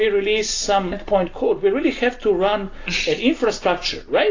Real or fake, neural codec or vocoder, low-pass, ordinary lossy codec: real; none; 7.2 kHz; AAC, 32 kbps